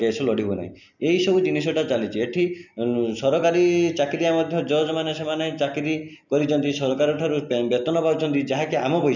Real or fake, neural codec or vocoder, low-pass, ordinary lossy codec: real; none; 7.2 kHz; none